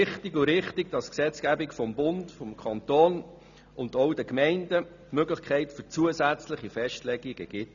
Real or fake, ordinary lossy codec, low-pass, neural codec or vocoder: real; none; 7.2 kHz; none